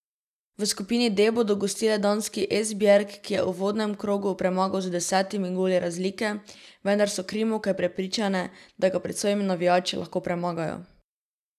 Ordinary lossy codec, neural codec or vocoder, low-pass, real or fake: none; none; 14.4 kHz; real